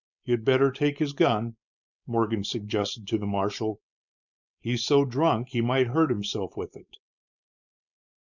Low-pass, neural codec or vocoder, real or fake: 7.2 kHz; codec, 16 kHz, 4.8 kbps, FACodec; fake